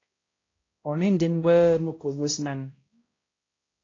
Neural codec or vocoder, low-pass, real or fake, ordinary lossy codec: codec, 16 kHz, 0.5 kbps, X-Codec, HuBERT features, trained on balanced general audio; 7.2 kHz; fake; AAC, 32 kbps